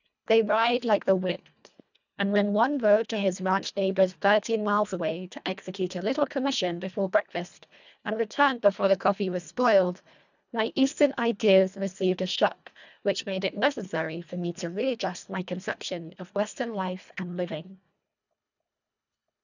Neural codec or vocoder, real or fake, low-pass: codec, 24 kHz, 1.5 kbps, HILCodec; fake; 7.2 kHz